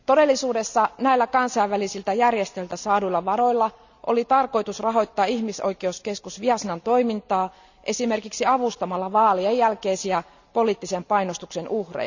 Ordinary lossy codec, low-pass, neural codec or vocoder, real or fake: none; 7.2 kHz; none; real